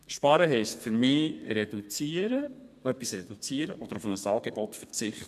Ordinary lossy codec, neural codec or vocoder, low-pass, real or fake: MP3, 96 kbps; codec, 32 kHz, 1.9 kbps, SNAC; 14.4 kHz; fake